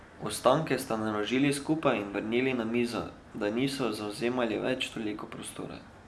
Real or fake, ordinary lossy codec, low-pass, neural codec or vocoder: real; none; none; none